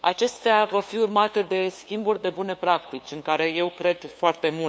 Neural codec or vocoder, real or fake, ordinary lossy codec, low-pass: codec, 16 kHz, 2 kbps, FunCodec, trained on LibriTTS, 25 frames a second; fake; none; none